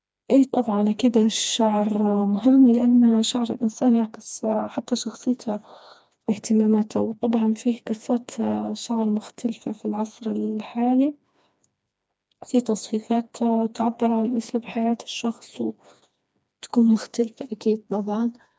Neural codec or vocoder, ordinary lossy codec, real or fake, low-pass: codec, 16 kHz, 2 kbps, FreqCodec, smaller model; none; fake; none